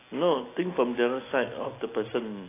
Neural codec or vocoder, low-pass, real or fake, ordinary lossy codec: none; 3.6 kHz; real; AAC, 24 kbps